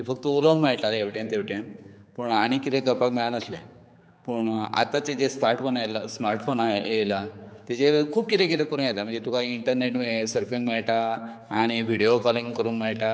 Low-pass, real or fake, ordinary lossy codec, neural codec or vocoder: none; fake; none; codec, 16 kHz, 4 kbps, X-Codec, HuBERT features, trained on general audio